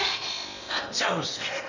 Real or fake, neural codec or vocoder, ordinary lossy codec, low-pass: fake; codec, 16 kHz in and 24 kHz out, 0.8 kbps, FocalCodec, streaming, 65536 codes; none; 7.2 kHz